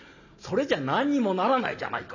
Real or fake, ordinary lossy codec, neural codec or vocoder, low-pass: real; none; none; 7.2 kHz